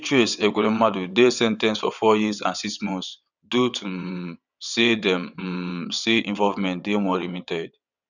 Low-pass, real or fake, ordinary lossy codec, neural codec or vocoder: 7.2 kHz; fake; none; vocoder, 22.05 kHz, 80 mel bands, WaveNeXt